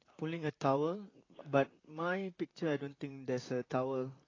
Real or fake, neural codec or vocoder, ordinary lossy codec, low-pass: fake; vocoder, 44.1 kHz, 128 mel bands, Pupu-Vocoder; AAC, 32 kbps; 7.2 kHz